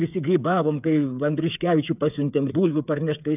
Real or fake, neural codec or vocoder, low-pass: fake; codec, 16 kHz, 8 kbps, FreqCodec, smaller model; 3.6 kHz